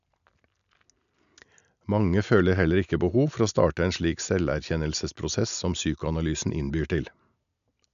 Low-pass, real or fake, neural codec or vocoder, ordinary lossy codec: 7.2 kHz; real; none; none